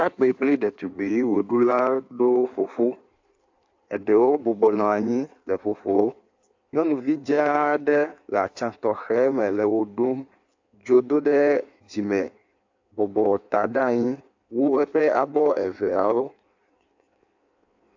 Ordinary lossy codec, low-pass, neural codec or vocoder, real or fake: AAC, 48 kbps; 7.2 kHz; codec, 16 kHz in and 24 kHz out, 1.1 kbps, FireRedTTS-2 codec; fake